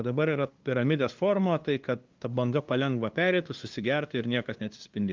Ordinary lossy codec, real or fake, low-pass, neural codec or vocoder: Opus, 32 kbps; fake; 7.2 kHz; codec, 16 kHz, 2 kbps, FunCodec, trained on LibriTTS, 25 frames a second